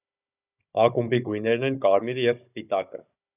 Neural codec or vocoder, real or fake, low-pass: codec, 16 kHz, 16 kbps, FunCodec, trained on Chinese and English, 50 frames a second; fake; 3.6 kHz